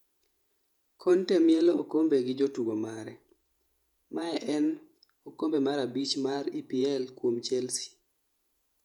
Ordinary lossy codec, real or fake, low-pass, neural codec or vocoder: none; fake; 19.8 kHz; vocoder, 48 kHz, 128 mel bands, Vocos